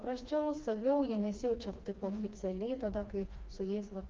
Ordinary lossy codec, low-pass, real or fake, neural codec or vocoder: Opus, 32 kbps; 7.2 kHz; fake; codec, 16 kHz, 2 kbps, FreqCodec, smaller model